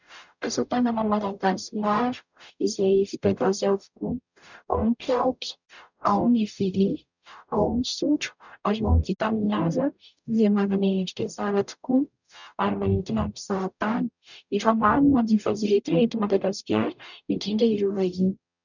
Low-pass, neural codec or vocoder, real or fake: 7.2 kHz; codec, 44.1 kHz, 0.9 kbps, DAC; fake